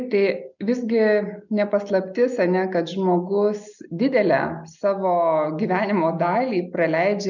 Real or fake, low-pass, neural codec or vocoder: real; 7.2 kHz; none